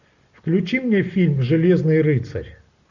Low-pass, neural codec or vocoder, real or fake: 7.2 kHz; none; real